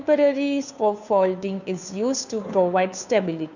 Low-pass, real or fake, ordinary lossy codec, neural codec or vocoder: 7.2 kHz; fake; none; codec, 16 kHz, 2 kbps, FunCodec, trained on Chinese and English, 25 frames a second